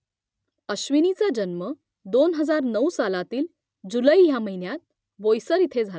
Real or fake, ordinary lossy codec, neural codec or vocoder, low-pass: real; none; none; none